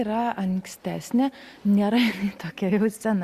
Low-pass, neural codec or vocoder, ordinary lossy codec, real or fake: 14.4 kHz; none; Opus, 64 kbps; real